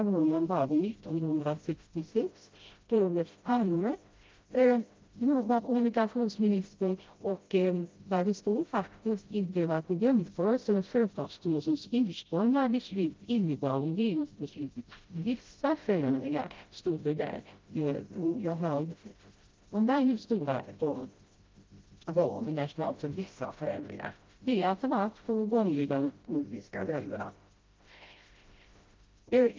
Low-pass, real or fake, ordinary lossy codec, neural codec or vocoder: 7.2 kHz; fake; Opus, 32 kbps; codec, 16 kHz, 0.5 kbps, FreqCodec, smaller model